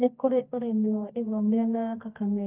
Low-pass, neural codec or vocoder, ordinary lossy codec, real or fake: 3.6 kHz; codec, 24 kHz, 0.9 kbps, WavTokenizer, medium music audio release; Opus, 32 kbps; fake